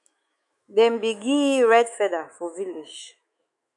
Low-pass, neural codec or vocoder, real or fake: 10.8 kHz; autoencoder, 48 kHz, 128 numbers a frame, DAC-VAE, trained on Japanese speech; fake